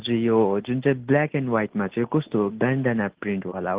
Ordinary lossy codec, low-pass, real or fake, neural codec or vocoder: Opus, 16 kbps; 3.6 kHz; real; none